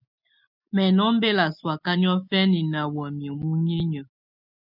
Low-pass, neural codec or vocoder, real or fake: 5.4 kHz; none; real